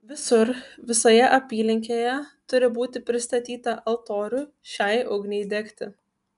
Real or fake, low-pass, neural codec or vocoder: real; 10.8 kHz; none